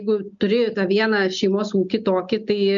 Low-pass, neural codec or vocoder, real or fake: 7.2 kHz; none; real